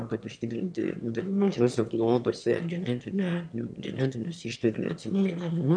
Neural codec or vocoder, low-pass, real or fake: autoencoder, 22.05 kHz, a latent of 192 numbers a frame, VITS, trained on one speaker; 9.9 kHz; fake